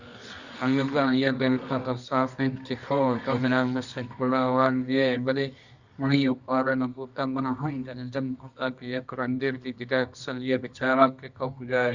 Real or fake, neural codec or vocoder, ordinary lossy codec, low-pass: fake; codec, 24 kHz, 0.9 kbps, WavTokenizer, medium music audio release; Opus, 64 kbps; 7.2 kHz